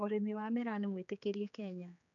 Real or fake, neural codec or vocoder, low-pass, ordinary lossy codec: fake; codec, 16 kHz, 4 kbps, X-Codec, HuBERT features, trained on general audio; 7.2 kHz; none